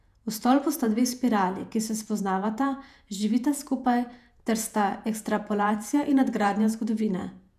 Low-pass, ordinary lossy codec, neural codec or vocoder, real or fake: 14.4 kHz; none; vocoder, 48 kHz, 128 mel bands, Vocos; fake